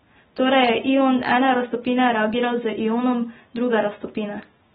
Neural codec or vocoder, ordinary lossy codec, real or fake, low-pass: none; AAC, 16 kbps; real; 7.2 kHz